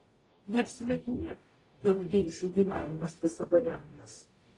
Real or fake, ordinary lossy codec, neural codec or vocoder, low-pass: fake; AAC, 32 kbps; codec, 44.1 kHz, 0.9 kbps, DAC; 10.8 kHz